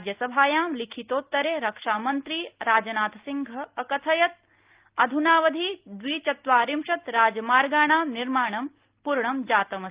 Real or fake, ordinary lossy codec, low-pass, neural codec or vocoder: real; Opus, 32 kbps; 3.6 kHz; none